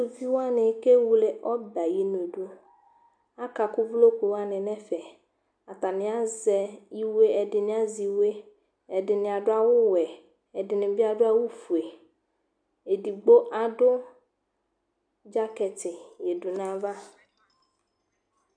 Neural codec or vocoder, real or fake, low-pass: none; real; 9.9 kHz